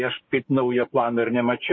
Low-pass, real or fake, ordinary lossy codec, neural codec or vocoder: 7.2 kHz; fake; MP3, 32 kbps; codec, 16 kHz, 6 kbps, DAC